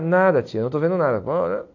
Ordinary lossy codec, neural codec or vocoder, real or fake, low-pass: AAC, 48 kbps; none; real; 7.2 kHz